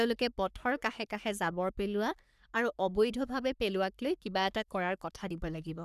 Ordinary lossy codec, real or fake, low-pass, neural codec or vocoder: none; fake; 14.4 kHz; codec, 44.1 kHz, 3.4 kbps, Pupu-Codec